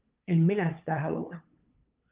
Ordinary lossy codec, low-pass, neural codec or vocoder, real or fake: Opus, 32 kbps; 3.6 kHz; codec, 16 kHz, 8 kbps, FunCodec, trained on LibriTTS, 25 frames a second; fake